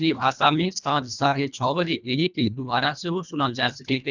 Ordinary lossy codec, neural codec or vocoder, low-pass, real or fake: none; codec, 24 kHz, 1.5 kbps, HILCodec; 7.2 kHz; fake